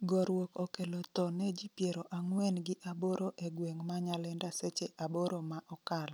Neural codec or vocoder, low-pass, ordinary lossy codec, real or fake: none; none; none; real